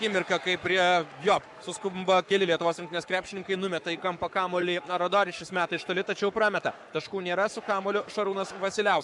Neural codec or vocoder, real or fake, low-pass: codec, 44.1 kHz, 7.8 kbps, Pupu-Codec; fake; 10.8 kHz